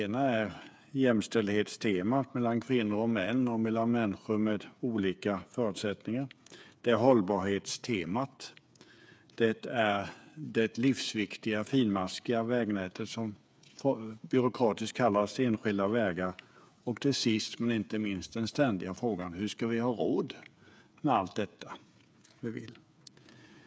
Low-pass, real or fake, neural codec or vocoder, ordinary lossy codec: none; fake; codec, 16 kHz, 8 kbps, FreqCodec, smaller model; none